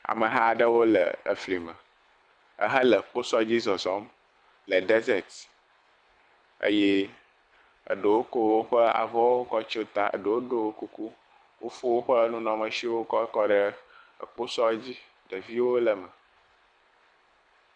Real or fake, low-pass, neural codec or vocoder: fake; 9.9 kHz; codec, 24 kHz, 6 kbps, HILCodec